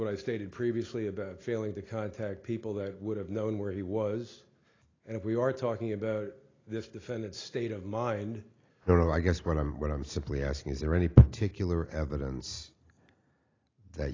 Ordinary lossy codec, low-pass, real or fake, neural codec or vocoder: AAC, 32 kbps; 7.2 kHz; real; none